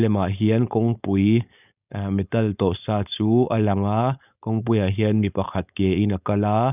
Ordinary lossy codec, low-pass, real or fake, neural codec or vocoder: none; 3.6 kHz; fake; codec, 16 kHz, 4.8 kbps, FACodec